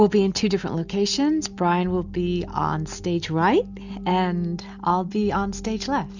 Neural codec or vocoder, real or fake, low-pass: none; real; 7.2 kHz